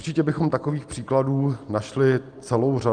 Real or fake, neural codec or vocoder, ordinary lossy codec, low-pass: real; none; Opus, 32 kbps; 9.9 kHz